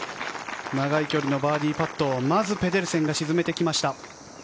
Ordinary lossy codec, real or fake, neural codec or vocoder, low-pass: none; real; none; none